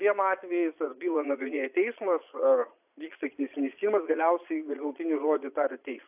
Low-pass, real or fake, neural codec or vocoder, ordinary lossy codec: 3.6 kHz; fake; vocoder, 22.05 kHz, 80 mel bands, Vocos; AAC, 32 kbps